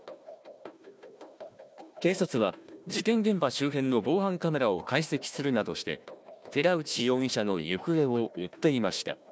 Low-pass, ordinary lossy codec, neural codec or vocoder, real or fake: none; none; codec, 16 kHz, 1 kbps, FunCodec, trained on Chinese and English, 50 frames a second; fake